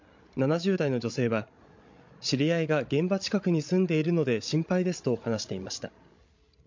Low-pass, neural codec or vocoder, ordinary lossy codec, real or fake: 7.2 kHz; codec, 16 kHz, 16 kbps, FreqCodec, larger model; MP3, 48 kbps; fake